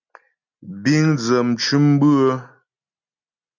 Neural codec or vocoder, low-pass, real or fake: none; 7.2 kHz; real